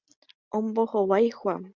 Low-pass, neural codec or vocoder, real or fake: 7.2 kHz; none; real